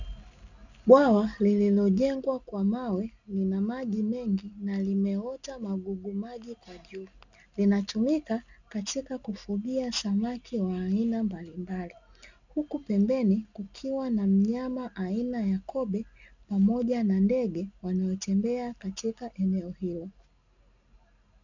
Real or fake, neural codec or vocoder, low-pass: real; none; 7.2 kHz